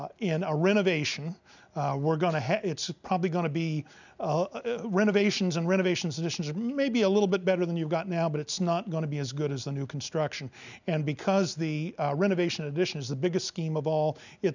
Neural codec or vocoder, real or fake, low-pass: none; real; 7.2 kHz